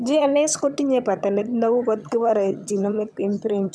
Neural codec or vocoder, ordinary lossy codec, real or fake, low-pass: vocoder, 22.05 kHz, 80 mel bands, HiFi-GAN; none; fake; none